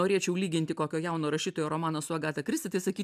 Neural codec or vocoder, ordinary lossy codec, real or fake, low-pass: none; AAC, 96 kbps; real; 14.4 kHz